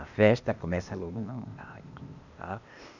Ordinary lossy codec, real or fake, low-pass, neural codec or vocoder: none; fake; 7.2 kHz; codec, 16 kHz, 0.8 kbps, ZipCodec